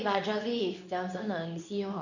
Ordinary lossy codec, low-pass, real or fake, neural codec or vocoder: none; 7.2 kHz; fake; codec, 24 kHz, 0.9 kbps, WavTokenizer, medium speech release version 2